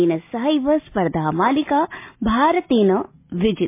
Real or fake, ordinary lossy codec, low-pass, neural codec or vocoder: real; MP3, 24 kbps; 3.6 kHz; none